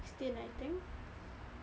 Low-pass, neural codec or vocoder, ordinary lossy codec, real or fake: none; none; none; real